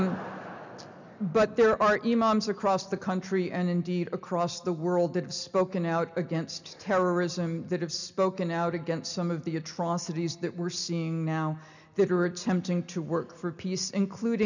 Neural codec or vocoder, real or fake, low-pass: none; real; 7.2 kHz